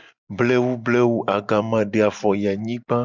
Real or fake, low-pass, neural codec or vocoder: real; 7.2 kHz; none